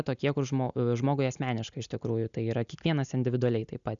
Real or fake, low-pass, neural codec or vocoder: real; 7.2 kHz; none